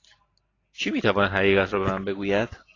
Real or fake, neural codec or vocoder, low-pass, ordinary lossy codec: real; none; 7.2 kHz; AAC, 32 kbps